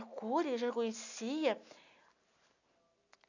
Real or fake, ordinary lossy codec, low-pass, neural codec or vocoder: real; none; 7.2 kHz; none